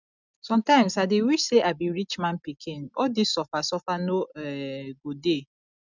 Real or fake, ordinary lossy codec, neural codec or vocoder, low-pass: real; none; none; 7.2 kHz